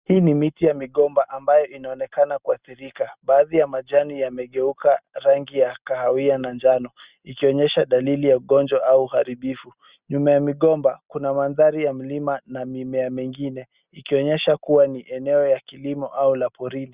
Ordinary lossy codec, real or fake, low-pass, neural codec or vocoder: Opus, 64 kbps; real; 3.6 kHz; none